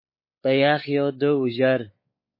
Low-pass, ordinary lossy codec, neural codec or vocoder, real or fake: 5.4 kHz; MP3, 24 kbps; codec, 16 kHz, 4 kbps, X-Codec, WavLM features, trained on Multilingual LibriSpeech; fake